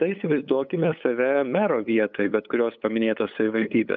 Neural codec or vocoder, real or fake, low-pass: codec, 16 kHz, 8 kbps, FunCodec, trained on LibriTTS, 25 frames a second; fake; 7.2 kHz